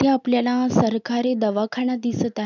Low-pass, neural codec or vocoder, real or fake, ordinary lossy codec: 7.2 kHz; none; real; none